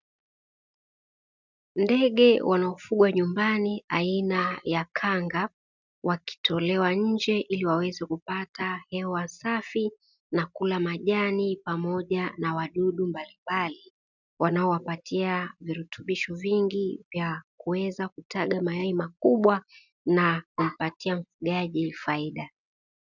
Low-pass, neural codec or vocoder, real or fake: 7.2 kHz; none; real